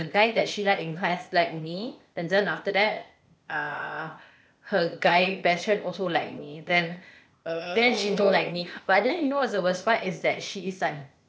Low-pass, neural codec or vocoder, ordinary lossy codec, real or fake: none; codec, 16 kHz, 0.8 kbps, ZipCodec; none; fake